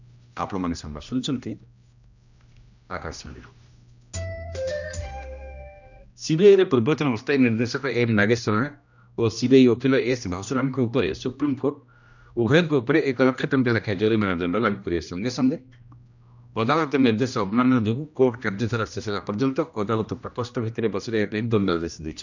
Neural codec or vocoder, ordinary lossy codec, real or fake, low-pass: codec, 16 kHz, 1 kbps, X-Codec, HuBERT features, trained on general audio; none; fake; 7.2 kHz